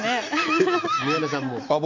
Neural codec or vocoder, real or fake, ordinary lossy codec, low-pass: none; real; MP3, 48 kbps; 7.2 kHz